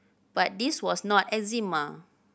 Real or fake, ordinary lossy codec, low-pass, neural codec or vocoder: real; none; none; none